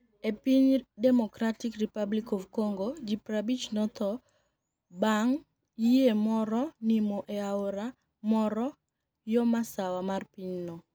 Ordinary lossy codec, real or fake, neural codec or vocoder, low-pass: none; real; none; none